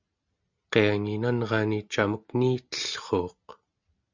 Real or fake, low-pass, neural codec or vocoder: real; 7.2 kHz; none